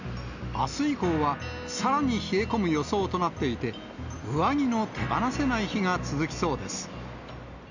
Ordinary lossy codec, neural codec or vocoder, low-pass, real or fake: none; none; 7.2 kHz; real